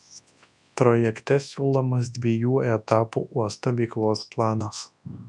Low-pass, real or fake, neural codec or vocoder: 10.8 kHz; fake; codec, 24 kHz, 0.9 kbps, WavTokenizer, large speech release